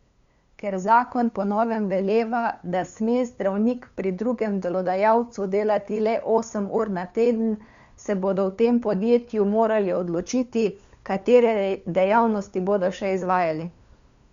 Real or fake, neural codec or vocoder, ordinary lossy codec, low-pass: fake; codec, 16 kHz, 2 kbps, FunCodec, trained on LibriTTS, 25 frames a second; Opus, 64 kbps; 7.2 kHz